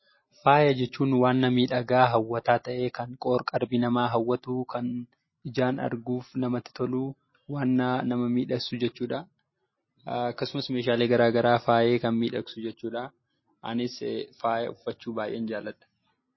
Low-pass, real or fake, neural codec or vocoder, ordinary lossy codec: 7.2 kHz; real; none; MP3, 24 kbps